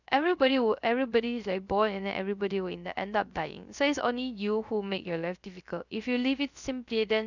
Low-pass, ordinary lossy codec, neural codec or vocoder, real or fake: 7.2 kHz; none; codec, 16 kHz, 0.3 kbps, FocalCodec; fake